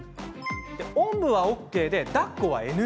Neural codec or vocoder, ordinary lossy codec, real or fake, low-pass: none; none; real; none